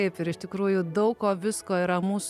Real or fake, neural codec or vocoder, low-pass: fake; vocoder, 44.1 kHz, 128 mel bands every 512 samples, BigVGAN v2; 14.4 kHz